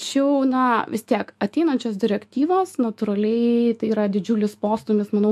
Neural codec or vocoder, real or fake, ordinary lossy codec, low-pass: autoencoder, 48 kHz, 128 numbers a frame, DAC-VAE, trained on Japanese speech; fake; MP3, 64 kbps; 14.4 kHz